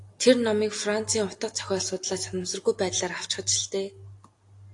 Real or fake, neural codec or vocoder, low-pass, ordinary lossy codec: real; none; 10.8 kHz; AAC, 48 kbps